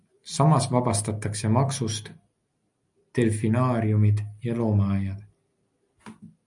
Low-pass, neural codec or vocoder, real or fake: 10.8 kHz; none; real